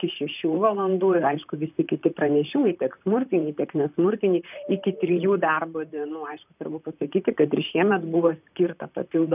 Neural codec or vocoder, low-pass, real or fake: vocoder, 44.1 kHz, 128 mel bands, Pupu-Vocoder; 3.6 kHz; fake